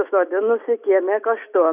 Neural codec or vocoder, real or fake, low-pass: none; real; 3.6 kHz